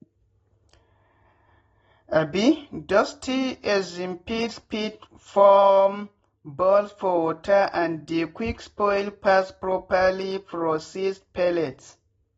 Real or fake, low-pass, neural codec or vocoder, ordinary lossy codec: real; 19.8 kHz; none; AAC, 24 kbps